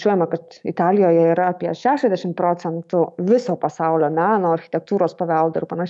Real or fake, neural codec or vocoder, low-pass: fake; autoencoder, 48 kHz, 128 numbers a frame, DAC-VAE, trained on Japanese speech; 10.8 kHz